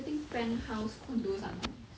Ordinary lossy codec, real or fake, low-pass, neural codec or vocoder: none; real; none; none